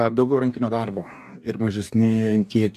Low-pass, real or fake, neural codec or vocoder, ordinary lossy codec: 14.4 kHz; fake; codec, 44.1 kHz, 2.6 kbps, DAC; AAC, 96 kbps